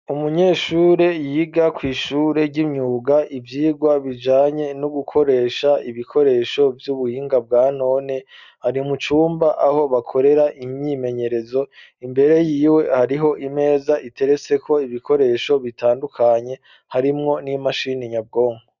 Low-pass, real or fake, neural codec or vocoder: 7.2 kHz; real; none